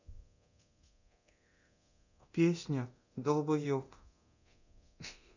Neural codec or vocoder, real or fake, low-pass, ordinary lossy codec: codec, 24 kHz, 0.9 kbps, DualCodec; fake; 7.2 kHz; none